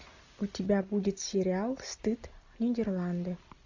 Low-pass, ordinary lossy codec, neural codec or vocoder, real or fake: 7.2 kHz; AAC, 48 kbps; none; real